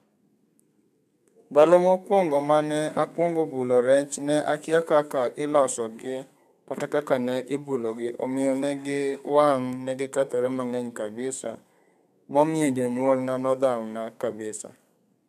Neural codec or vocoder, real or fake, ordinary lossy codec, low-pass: codec, 32 kHz, 1.9 kbps, SNAC; fake; none; 14.4 kHz